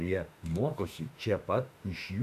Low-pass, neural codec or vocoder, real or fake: 14.4 kHz; autoencoder, 48 kHz, 32 numbers a frame, DAC-VAE, trained on Japanese speech; fake